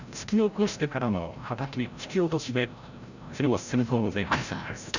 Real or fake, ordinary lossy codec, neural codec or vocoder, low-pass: fake; none; codec, 16 kHz, 0.5 kbps, FreqCodec, larger model; 7.2 kHz